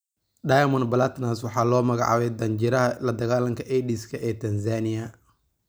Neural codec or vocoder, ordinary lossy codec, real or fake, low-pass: none; none; real; none